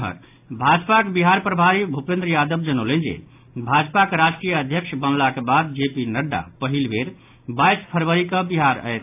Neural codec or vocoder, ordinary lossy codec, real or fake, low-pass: none; AAC, 32 kbps; real; 3.6 kHz